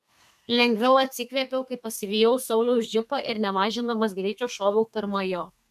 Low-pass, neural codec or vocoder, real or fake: 14.4 kHz; codec, 32 kHz, 1.9 kbps, SNAC; fake